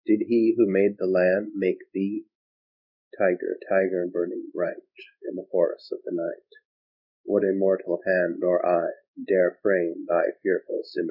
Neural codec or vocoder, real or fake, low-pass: codec, 16 kHz in and 24 kHz out, 1 kbps, XY-Tokenizer; fake; 5.4 kHz